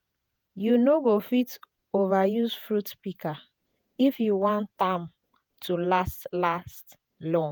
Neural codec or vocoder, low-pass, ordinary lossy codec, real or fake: vocoder, 48 kHz, 128 mel bands, Vocos; none; none; fake